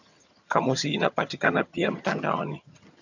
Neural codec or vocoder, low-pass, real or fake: vocoder, 22.05 kHz, 80 mel bands, HiFi-GAN; 7.2 kHz; fake